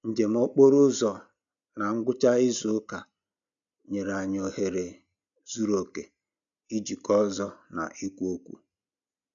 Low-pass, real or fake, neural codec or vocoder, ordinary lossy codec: 7.2 kHz; real; none; none